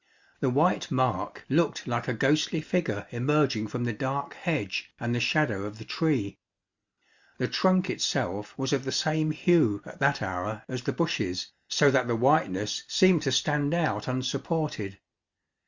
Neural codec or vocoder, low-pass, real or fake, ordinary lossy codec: none; 7.2 kHz; real; Opus, 64 kbps